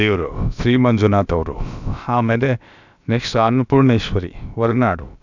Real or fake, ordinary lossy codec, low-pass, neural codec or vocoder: fake; none; 7.2 kHz; codec, 16 kHz, about 1 kbps, DyCAST, with the encoder's durations